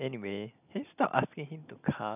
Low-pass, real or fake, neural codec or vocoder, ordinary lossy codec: 3.6 kHz; real; none; none